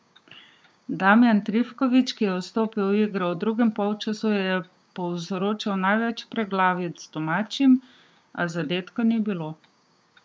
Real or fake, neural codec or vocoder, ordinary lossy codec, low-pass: fake; codec, 16 kHz, 6 kbps, DAC; none; none